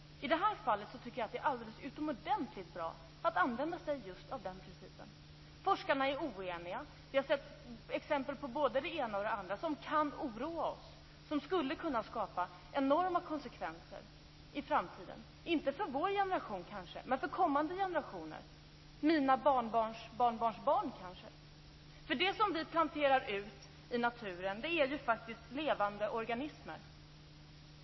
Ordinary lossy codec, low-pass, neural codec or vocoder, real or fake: MP3, 24 kbps; 7.2 kHz; none; real